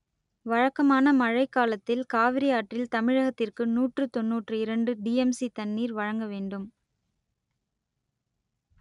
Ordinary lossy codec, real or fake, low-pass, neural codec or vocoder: none; real; 10.8 kHz; none